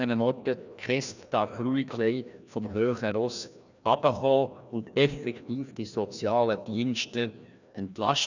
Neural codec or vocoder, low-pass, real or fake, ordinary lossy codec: codec, 16 kHz, 1 kbps, FreqCodec, larger model; 7.2 kHz; fake; none